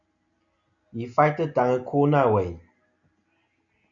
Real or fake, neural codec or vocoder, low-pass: real; none; 7.2 kHz